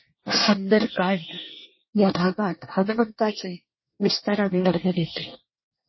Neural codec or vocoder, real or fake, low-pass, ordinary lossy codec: codec, 24 kHz, 1 kbps, SNAC; fake; 7.2 kHz; MP3, 24 kbps